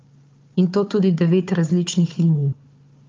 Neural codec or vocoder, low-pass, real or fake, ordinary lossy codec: codec, 16 kHz, 4 kbps, FunCodec, trained on Chinese and English, 50 frames a second; 7.2 kHz; fake; Opus, 24 kbps